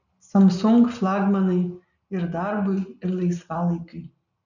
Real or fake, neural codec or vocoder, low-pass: real; none; 7.2 kHz